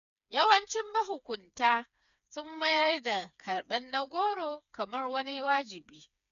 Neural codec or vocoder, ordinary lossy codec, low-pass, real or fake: codec, 16 kHz, 4 kbps, FreqCodec, smaller model; none; 7.2 kHz; fake